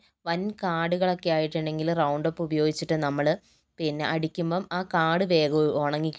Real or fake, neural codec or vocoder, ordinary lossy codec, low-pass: real; none; none; none